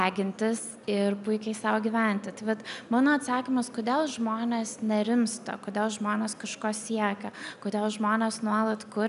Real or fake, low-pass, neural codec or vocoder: real; 10.8 kHz; none